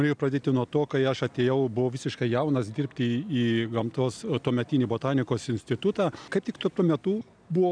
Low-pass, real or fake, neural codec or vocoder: 9.9 kHz; real; none